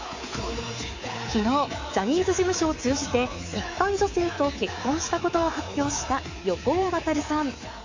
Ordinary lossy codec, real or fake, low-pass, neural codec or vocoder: AAC, 48 kbps; fake; 7.2 kHz; codec, 24 kHz, 3.1 kbps, DualCodec